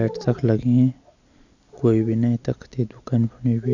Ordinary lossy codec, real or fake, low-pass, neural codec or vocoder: none; real; 7.2 kHz; none